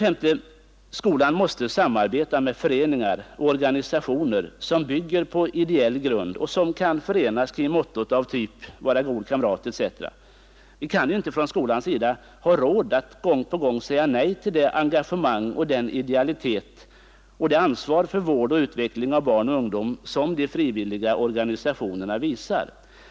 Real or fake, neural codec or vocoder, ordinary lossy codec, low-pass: real; none; none; none